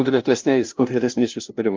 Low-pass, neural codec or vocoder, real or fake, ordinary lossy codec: 7.2 kHz; codec, 16 kHz, 0.5 kbps, FunCodec, trained on LibriTTS, 25 frames a second; fake; Opus, 24 kbps